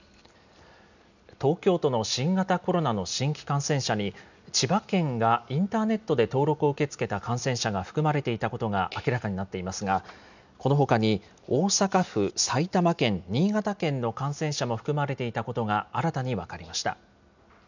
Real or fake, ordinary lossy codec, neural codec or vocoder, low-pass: real; none; none; 7.2 kHz